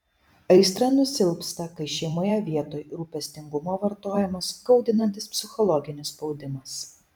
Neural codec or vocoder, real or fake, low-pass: vocoder, 44.1 kHz, 128 mel bands every 512 samples, BigVGAN v2; fake; 19.8 kHz